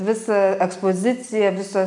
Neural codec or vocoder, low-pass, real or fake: none; 10.8 kHz; real